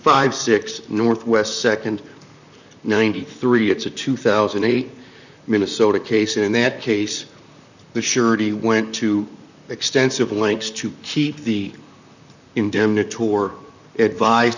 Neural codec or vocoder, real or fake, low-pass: vocoder, 44.1 kHz, 128 mel bands, Pupu-Vocoder; fake; 7.2 kHz